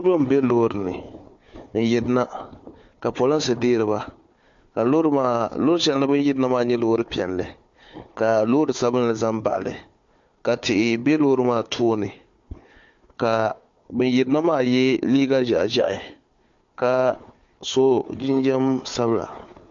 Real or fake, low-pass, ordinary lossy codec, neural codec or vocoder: fake; 7.2 kHz; MP3, 48 kbps; codec, 16 kHz, 4 kbps, FunCodec, trained on Chinese and English, 50 frames a second